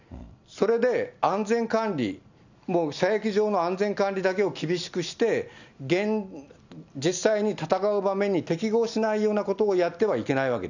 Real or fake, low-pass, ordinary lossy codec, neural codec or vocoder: real; 7.2 kHz; none; none